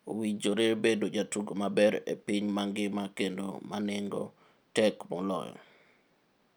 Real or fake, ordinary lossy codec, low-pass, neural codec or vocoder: real; none; none; none